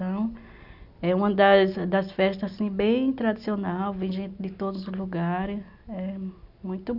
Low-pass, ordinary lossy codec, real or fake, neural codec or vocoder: 5.4 kHz; none; real; none